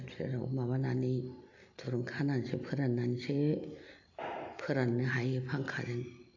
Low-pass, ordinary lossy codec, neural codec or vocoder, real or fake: 7.2 kHz; AAC, 48 kbps; none; real